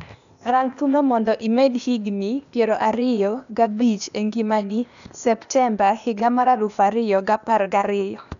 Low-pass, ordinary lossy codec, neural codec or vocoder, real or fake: 7.2 kHz; none; codec, 16 kHz, 0.8 kbps, ZipCodec; fake